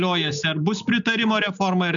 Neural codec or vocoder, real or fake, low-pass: none; real; 7.2 kHz